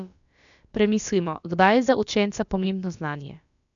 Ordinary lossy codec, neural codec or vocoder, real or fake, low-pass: none; codec, 16 kHz, about 1 kbps, DyCAST, with the encoder's durations; fake; 7.2 kHz